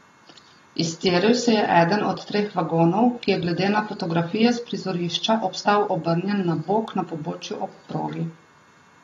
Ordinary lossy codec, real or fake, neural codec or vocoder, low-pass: AAC, 32 kbps; real; none; 19.8 kHz